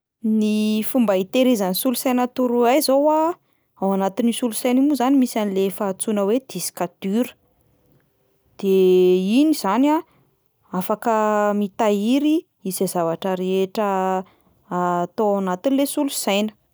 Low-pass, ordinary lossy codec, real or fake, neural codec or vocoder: none; none; real; none